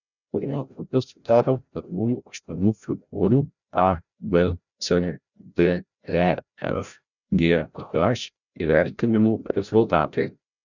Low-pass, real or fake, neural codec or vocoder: 7.2 kHz; fake; codec, 16 kHz, 0.5 kbps, FreqCodec, larger model